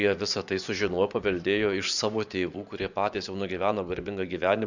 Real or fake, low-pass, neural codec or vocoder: fake; 7.2 kHz; vocoder, 24 kHz, 100 mel bands, Vocos